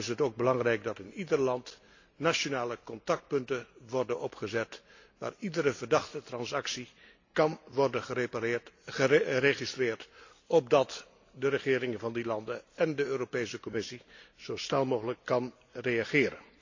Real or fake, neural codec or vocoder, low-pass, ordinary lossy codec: real; none; 7.2 kHz; AAC, 48 kbps